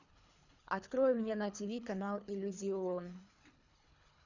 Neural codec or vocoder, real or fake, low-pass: codec, 24 kHz, 3 kbps, HILCodec; fake; 7.2 kHz